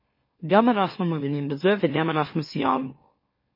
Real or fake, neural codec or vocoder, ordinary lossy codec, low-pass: fake; autoencoder, 44.1 kHz, a latent of 192 numbers a frame, MeloTTS; MP3, 24 kbps; 5.4 kHz